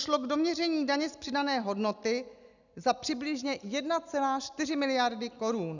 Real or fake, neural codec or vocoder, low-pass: real; none; 7.2 kHz